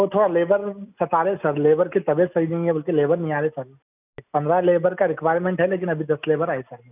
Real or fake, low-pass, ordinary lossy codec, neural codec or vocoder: real; 3.6 kHz; none; none